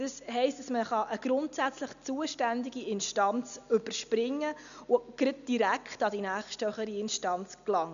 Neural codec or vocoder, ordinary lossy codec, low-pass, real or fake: none; none; 7.2 kHz; real